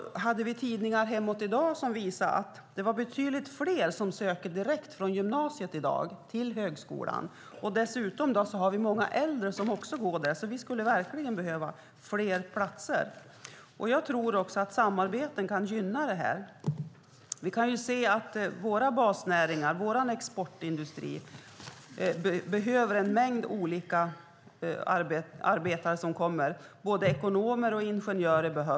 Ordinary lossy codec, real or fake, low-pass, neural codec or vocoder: none; real; none; none